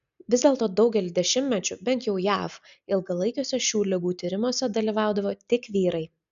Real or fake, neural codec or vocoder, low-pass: real; none; 7.2 kHz